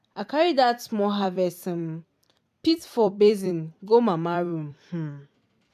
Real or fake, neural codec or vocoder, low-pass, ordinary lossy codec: fake; vocoder, 44.1 kHz, 128 mel bands every 256 samples, BigVGAN v2; 14.4 kHz; MP3, 96 kbps